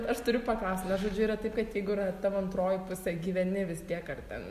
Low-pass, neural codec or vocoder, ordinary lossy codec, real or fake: 14.4 kHz; none; MP3, 64 kbps; real